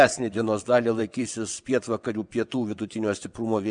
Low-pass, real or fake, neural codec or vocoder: 9.9 kHz; fake; vocoder, 22.05 kHz, 80 mel bands, WaveNeXt